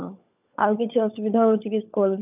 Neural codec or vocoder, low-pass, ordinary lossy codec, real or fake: codec, 16 kHz, 16 kbps, FunCodec, trained on LibriTTS, 50 frames a second; 3.6 kHz; none; fake